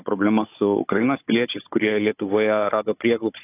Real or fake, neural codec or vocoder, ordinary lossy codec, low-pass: fake; codec, 16 kHz, 16 kbps, FunCodec, trained on Chinese and English, 50 frames a second; AAC, 24 kbps; 3.6 kHz